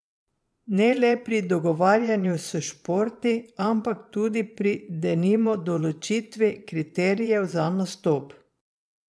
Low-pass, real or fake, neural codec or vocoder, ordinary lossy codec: none; fake; vocoder, 22.05 kHz, 80 mel bands, Vocos; none